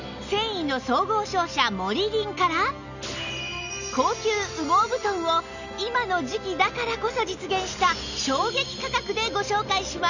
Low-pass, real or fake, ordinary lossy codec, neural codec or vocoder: 7.2 kHz; real; none; none